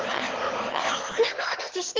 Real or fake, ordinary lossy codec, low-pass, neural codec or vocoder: fake; Opus, 16 kbps; 7.2 kHz; autoencoder, 22.05 kHz, a latent of 192 numbers a frame, VITS, trained on one speaker